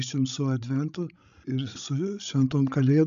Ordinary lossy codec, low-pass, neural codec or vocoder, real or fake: AAC, 96 kbps; 7.2 kHz; codec, 16 kHz, 16 kbps, FreqCodec, larger model; fake